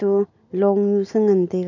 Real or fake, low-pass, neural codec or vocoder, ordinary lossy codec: real; 7.2 kHz; none; none